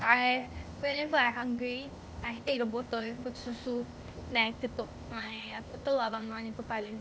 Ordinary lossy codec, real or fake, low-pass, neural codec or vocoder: none; fake; none; codec, 16 kHz, 0.8 kbps, ZipCodec